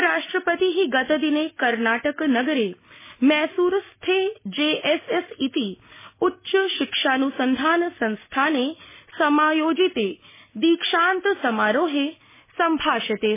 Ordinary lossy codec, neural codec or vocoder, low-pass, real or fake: MP3, 16 kbps; none; 3.6 kHz; real